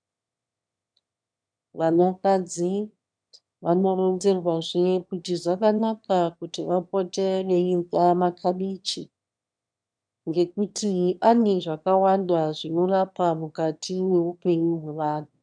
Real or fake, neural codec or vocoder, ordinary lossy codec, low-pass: fake; autoencoder, 22.05 kHz, a latent of 192 numbers a frame, VITS, trained on one speaker; MP3, 96 kbps; 9.9 kHz